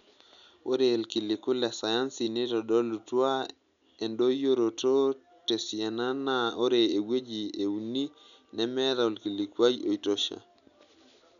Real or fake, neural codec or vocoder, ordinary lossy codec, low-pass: real; none; none; 7.2 kHz